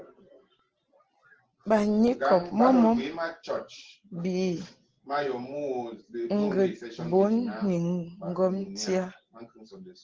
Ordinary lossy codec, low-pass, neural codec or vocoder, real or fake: Opus, 16 kbps; 7.2 kHz; none; real